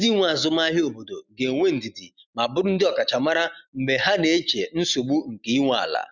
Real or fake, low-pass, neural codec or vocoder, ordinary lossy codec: real; 7.2 kHz; none; none